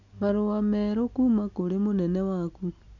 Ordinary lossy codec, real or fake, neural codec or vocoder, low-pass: none; real; none; 7.2 kHz